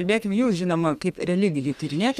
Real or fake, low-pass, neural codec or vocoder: fake; 14.4 kHz; codec, 32 kHz, 1.9 kbps, SNAC